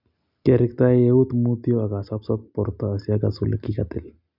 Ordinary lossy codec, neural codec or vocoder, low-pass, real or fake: none; none; 5.4 kHz; real